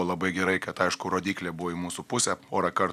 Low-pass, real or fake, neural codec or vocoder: 14.4 kHz; real; none